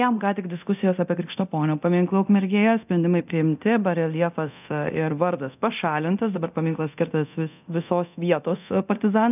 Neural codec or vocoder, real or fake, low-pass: codec, 24 kHz, 0.9 kbps, DualCodec; fake; 3.6 kHz